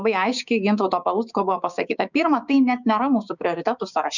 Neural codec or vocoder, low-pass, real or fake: codec, 16 kHz, 6 kbps, DAC; 7.2 kHz; fake